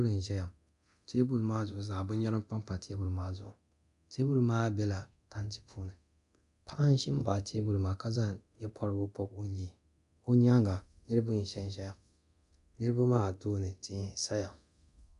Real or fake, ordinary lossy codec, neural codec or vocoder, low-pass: fake; MP3, 96 kbps; codec, 24 kHz, 0.9 kbps, DualCodec; 10.8 kHz